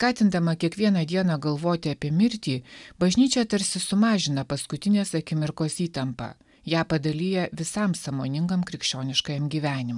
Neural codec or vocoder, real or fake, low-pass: none; real; 10.8 kHz